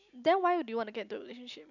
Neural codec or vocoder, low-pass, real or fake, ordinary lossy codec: none; 7.2 kHz; real; none